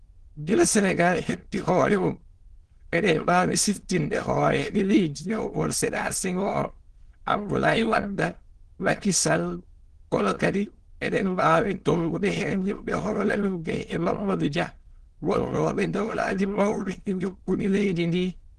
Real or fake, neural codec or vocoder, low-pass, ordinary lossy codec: fake; autoencoder, 22.05 kHz, a latent of 192 numbers a frame, VITS, trained on many speakers; 9.9 kHz; Opus, 16 kbps